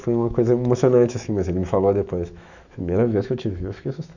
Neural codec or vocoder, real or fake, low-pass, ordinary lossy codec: autoencoder, 48 kHz, 128 numbers a frame, DAC-VAE, trained on Japanese speech; fake; 7.2 kHz; none